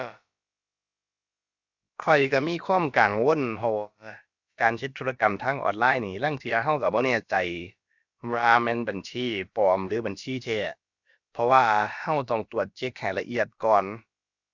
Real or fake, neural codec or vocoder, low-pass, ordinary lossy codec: fake; codec, 16 kHz, about 1 kbps, DyCAST, with the encoder's durations; 7.2 kHz; Opus, 64 kbps